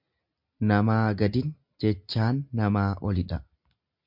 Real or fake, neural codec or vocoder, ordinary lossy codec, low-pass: real; none; MP3, 48 kbps; 5.4 kHz